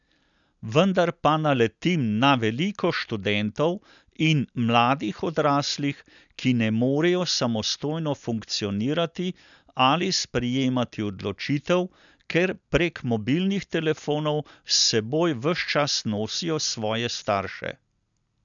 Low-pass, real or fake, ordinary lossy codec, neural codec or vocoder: 7.2 kHz; real; none; none